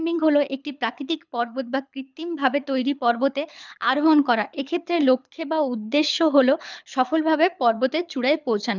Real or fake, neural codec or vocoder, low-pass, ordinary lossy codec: fake; codec, 24 kHz, 6 kbps, HILCodec; 7.2 kHz; none